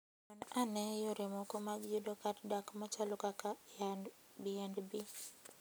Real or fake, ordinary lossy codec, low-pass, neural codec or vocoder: real; none; none; none